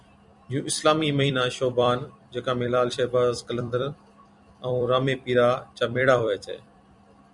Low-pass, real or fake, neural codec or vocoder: 10.8 kHz; fake; vocoder, 44.1 kHz, 128 mel bands every 256 samples, BigVGAN v2